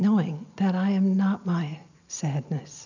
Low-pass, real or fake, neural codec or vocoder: 7.2 kHz; real; none